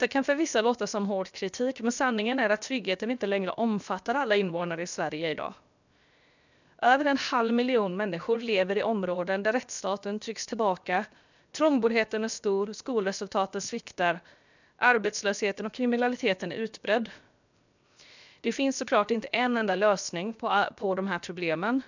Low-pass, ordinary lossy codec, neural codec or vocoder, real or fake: 7.2 kHz; none; codec, 16 kHz, 0.7 kbps, FocalCodec; fake